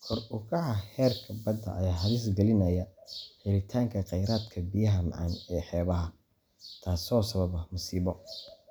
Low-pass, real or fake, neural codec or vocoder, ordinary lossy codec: none; real; none; none